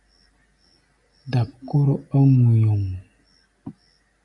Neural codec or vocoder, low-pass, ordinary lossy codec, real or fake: none; 10.8 kHz; MP3, 64 kbps; real